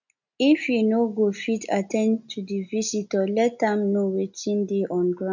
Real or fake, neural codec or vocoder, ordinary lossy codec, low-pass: real; none; none; 7.2 kHz